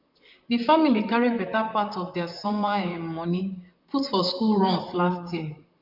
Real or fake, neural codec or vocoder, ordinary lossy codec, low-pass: fake; vocoder, 44.1 kHz, 128 mel bands, Pupu-Vocoder; none; 5.4 kHz